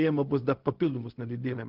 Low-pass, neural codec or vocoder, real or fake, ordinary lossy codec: 5.4 kHz; codec, 16 kHz, 0.4 kbps, LongCat-Audio-Codec; fake; Opus, 16 kbps